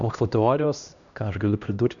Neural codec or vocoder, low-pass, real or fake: codec, 16 kHz, 1 kbps, X-Codec, HuBERT features, trained on LibriSpeech; 7.2 kHz; fake